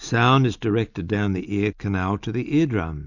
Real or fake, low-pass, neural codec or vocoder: real; 7.2 kHz; none